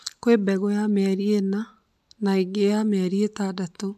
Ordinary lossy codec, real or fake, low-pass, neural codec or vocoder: MP3, 96 kbps; real; 14.4 kHz; none